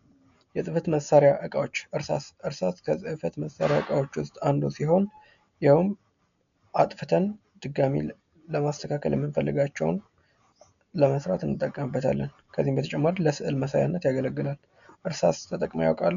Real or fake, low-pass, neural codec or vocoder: real; 7.2 kHz; none